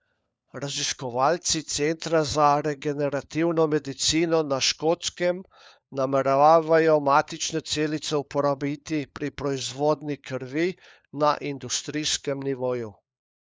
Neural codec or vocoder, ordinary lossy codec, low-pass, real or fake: codec, 16 kHz, 4 kbps, FunCodec, trained on LibriTTS, 50 frames a second; none; none; fake